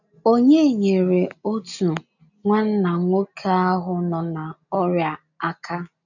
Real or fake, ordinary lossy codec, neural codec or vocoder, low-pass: real; none; none; 7.2 kHz